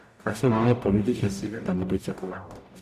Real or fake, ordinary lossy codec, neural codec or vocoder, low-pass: fake; none; codec, 44.1 kHz, 0.9 kbps, DAC; 14.4 kHz